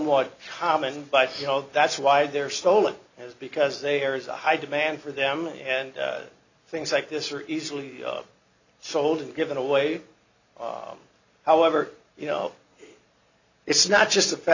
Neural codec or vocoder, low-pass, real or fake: none; 7.2 kHz; real